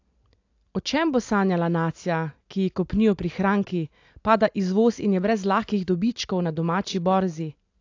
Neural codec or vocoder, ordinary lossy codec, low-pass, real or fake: none; AAC, 48 kbps; 7.2 kHz; real